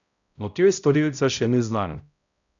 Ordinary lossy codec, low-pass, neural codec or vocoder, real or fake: none; 7.2 kHz; codec, 16 kHz, 0.5 kbps, X-Codec, HuBERT features, trained on balanced general audio; fake